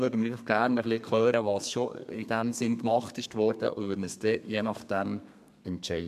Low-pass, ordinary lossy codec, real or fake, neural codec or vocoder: 14.4 kHz; MP3, 96 kbps; fake; codec, 32 kHz, 1.9 kbps, SNAC